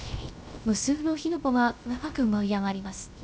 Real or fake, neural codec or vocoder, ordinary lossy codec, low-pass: fake; codec, 16 kHz, 0.3 kbps, FocalCodec; none; none